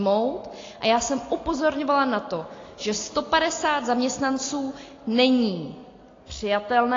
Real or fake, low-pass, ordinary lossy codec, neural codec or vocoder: real; 7.2 kHz; AAC, 32 kbps; none